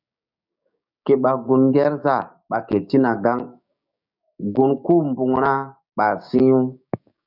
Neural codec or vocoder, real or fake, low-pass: codec, 16 kHz, 6 kbps, DAC; fake; 5.4 kHz